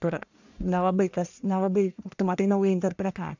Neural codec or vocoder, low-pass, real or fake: codec, 44.1 kHz, 1.7 kbps, Pupu-Codec; 7.2 kHz; fake